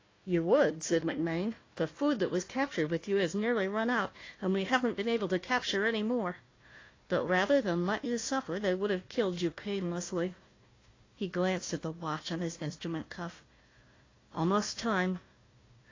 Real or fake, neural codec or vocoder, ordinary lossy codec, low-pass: fake; codec, 16 kHz, 1 kbps, FunCodec, trained on Chinese and English, 50 frames a second; AAC, 32 kbps; 7.2 kHz